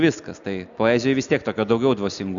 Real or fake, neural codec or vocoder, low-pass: real; none; 7.2 kHz